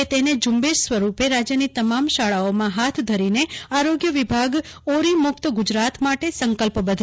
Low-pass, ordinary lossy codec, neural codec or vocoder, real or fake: none; none; none; real